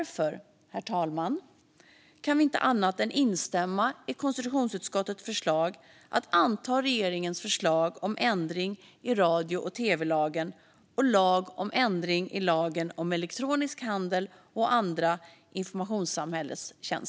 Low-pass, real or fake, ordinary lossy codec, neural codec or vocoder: none; real; none; none